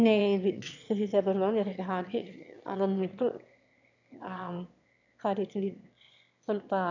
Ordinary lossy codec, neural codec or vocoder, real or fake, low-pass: none; autoencoder, 22.05 kHz, a latent of 192 numbers a frame, VITS, trained on one speaker; fake; 7.2 kHz